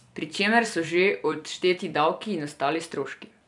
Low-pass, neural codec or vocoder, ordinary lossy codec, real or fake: 10.8 kHz; vocoder, 44.1 kHz, 128 mel bands every 256 samples, BigVGAN v2; none; fake